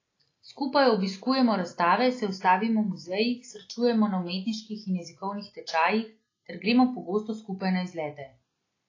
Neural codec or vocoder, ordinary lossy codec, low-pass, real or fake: none; AAC, 32 kbps; 7.2 kHz; real